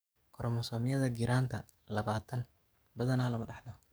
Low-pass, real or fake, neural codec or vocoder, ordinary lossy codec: none; fake; codec, 44.1 kHz, 7.8 kbps, DAC; none